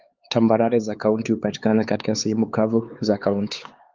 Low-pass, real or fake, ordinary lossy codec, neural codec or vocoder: 7.2 kHz; fake; Opus, 24 kbps; codec, 16 kHz, 4 kbps, X-Codec, HuBERT features, trained on LibriSpeech